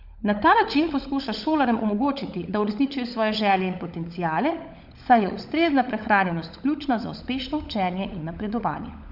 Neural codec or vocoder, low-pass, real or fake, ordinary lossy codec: codec, 16 kHz, 16 kbps, FunCodec, trained on LibriTTS, 50 frames a second; 5.4 kHz; fake; none